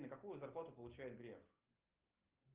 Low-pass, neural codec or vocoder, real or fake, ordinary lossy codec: 3.6 kHz; none; real; Opus, 32 kbps